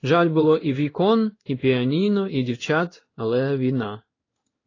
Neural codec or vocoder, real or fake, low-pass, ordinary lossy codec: codec, 16 kHz in and 24 kHz out, 1 kbps, XY-Tokenizer; fake; 7.2 kHz; AAC, 32 kbps